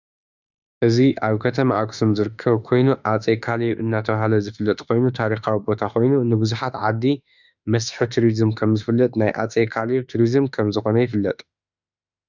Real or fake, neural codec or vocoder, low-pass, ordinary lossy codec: fake; autoencoder, 48 kHz, 32 numbers a frame, DAC-VAE, trained on Japanese speech; 7.2 kHz; Opus, 64 kbps